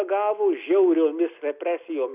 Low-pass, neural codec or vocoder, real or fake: 3.6 kHz; none; real